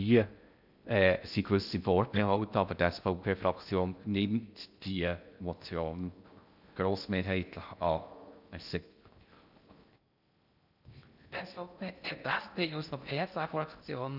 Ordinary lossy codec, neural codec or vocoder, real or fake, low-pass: none; codec, 16 kHz in and 24 kHz out, 0.6 kbps, FocalCodec, streaming, 2048 codes; fake; 5.4 kHz